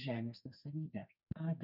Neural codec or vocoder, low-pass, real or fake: codec, 44.1 kHz, 2.6 kbps, SNAC; 5.4 kHz; fake